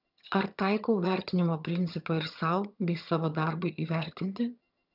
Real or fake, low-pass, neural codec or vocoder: fake; 5.4 kHz; vocoder, 22.05 kHz, 80 mel bands, HiFi-GAN